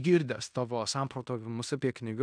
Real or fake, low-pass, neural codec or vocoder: fake; 9.9 kHz; codec, 16 kHz in and 24 kHz out, 0.9 kbps, LongCat-Audio-Codec, fine tuned four codebook decoder